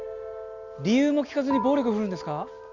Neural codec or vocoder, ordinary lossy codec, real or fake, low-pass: none; Opus, 64 kbps; real; 7.2 kHz